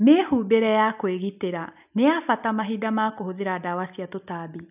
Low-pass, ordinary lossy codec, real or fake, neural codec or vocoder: 3.6 kHz; none; real; none